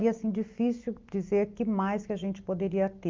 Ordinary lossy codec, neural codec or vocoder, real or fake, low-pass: Opus, 24 kbps; none; real; 7.2 kHz